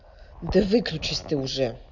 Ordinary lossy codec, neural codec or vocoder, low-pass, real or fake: none; none; 7.2 kHz; real